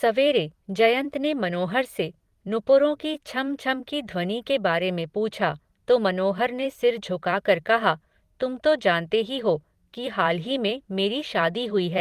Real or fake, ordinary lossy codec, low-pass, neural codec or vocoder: fake; Opus, 24 kbps; 14.4 kHz; vocoder, 44.1 kHz, 128 mel bands every 256 samples, BigVGAN v2